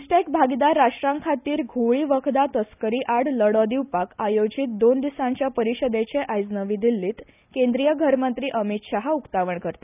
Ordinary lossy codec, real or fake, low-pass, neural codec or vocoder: none; real; 3.6 kHz; none